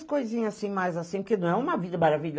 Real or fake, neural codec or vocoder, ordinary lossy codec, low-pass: real; none; none; none